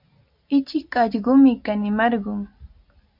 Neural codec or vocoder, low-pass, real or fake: none; 5.4 kHz; real